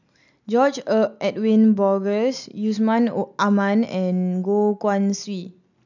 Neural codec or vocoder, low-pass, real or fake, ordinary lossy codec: none; 7.2 kHz; real; none